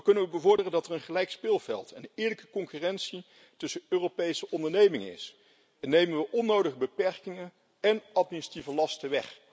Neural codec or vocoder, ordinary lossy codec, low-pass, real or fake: none; none; none; real